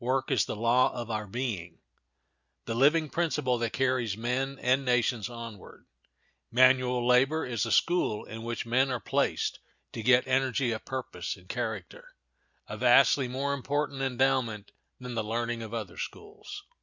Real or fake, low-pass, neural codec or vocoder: real; 7.2 kHz; none